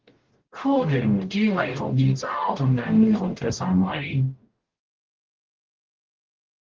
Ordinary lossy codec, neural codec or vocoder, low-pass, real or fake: Opus, 16 kbps; codec, 44.1 kHz, 0.9 kbps, DAC; 7.2 kHz; fake